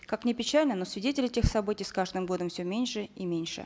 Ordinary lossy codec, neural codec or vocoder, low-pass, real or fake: none; none; none; real